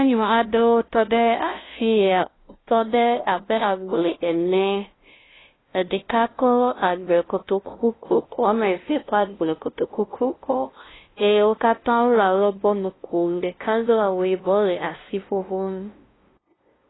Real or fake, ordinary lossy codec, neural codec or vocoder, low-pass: fake; AAC, 16 kbps; codec, 16 kHz, 0.5 kbps, FunCodec, trained on LibriTTS, 25 frames a second; 7.2 kHz